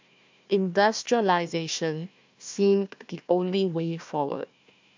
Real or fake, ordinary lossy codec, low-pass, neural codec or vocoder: fake; MP3, 64 kbps; 7.2 kHz; codec, 16 kHz, 1 kbps, FunCodec, trained on Chinese and English, 50 frames a second